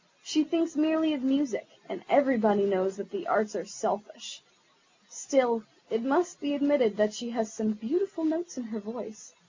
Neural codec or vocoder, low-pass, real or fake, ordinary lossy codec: none; 7.2 kHz; real; MP3, 48 kbps